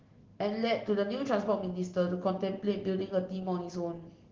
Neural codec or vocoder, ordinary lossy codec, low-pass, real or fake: none; Opus, 16 kbps; 7.2 kHz; real